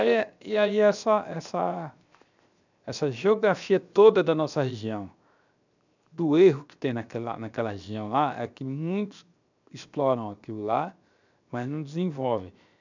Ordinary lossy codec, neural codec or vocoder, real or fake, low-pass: none; codec, 16 kHz, 0.7 kbps, FocalCodec; fake; 7.2 kHz